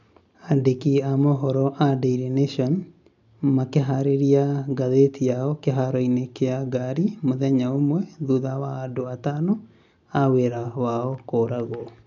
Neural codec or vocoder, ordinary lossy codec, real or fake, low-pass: none; none; real; 7.2 kHz